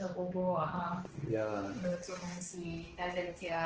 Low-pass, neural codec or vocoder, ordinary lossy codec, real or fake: 7.2 kHz; codec, 16 kHz, 2 kbps, X-Codec, HuBERT features, trained on balanced general audio; Opus, 16 kbps; fake